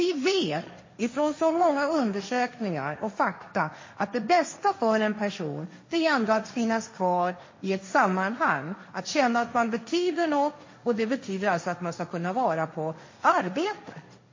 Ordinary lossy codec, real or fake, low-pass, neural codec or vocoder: MP3, 32 kbps; fake; 7.2 kHz; codec, 16 kHz, 1.1 kbps, Voila-Tokenizer